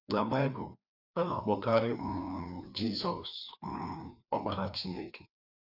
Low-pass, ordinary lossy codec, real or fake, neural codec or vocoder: 5.4 kHz; none; fake; codec, 16 kHz, 2 kbps, FreqCodec, larger model